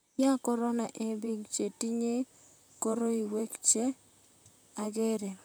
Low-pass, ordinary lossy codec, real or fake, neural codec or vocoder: none; none; fake; vocoder, 44.1 kHz, 128 mel bands, Pupu-Vocoder